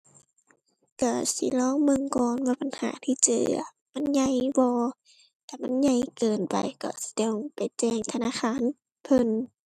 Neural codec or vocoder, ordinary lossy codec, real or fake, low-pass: none; none; real; 14.4 kHz